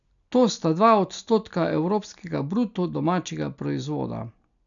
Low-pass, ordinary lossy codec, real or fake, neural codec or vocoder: 7.2 kHz; none; real; none